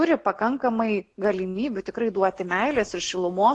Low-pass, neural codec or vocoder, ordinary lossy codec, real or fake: 10.8 kHz; none; AAC, 48 kbps; real